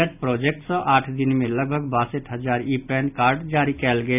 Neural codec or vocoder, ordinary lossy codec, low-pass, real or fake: none; none; 3.6 kHz; real